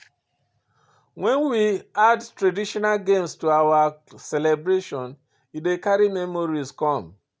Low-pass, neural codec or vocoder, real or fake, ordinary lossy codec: none; none; real; none